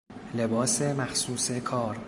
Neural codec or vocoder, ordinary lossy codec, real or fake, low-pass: vocoder, 44.1 kHz, 128 mel bands every 512 samples, BigVGAN v2; MP3, 96 kbps; fake; 10.8 kHz